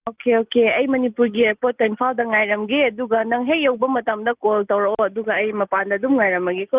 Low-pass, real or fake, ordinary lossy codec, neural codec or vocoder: 3.6 kHz; real; Opus, 32 kbps; none